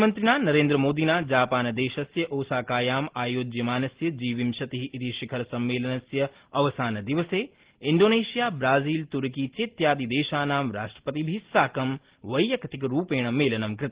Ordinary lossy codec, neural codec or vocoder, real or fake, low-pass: Opus, 16 kbps; none; real; 3.6 kHz